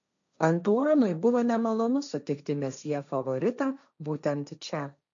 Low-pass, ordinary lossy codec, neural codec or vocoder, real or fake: 7.2 kHz; MP3, 96 kbps; codec, 16 kHz, 1.1 kbps, Voila-Tokenizer; fake